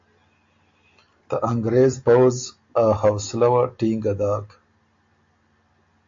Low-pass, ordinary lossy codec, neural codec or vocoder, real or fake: 7.2 kHz; AAC, 48 kbps; none; real